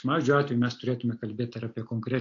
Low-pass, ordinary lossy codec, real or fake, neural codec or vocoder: 7.2 kHz; AAC, 48 kbps; real; none